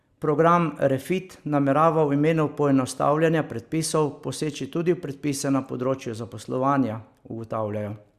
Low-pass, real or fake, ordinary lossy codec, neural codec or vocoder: 14.4 kHz; real; Opus, 64 kbps; none